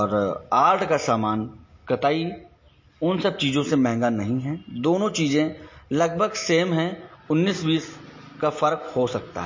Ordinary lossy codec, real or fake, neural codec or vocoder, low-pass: MP3, 32 kbps; real; none; 7.2 kHz